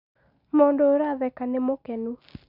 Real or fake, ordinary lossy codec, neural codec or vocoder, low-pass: real; none; none; 5.4 kHz